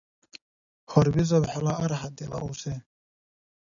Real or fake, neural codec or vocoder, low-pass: real; none; 7.2 kHz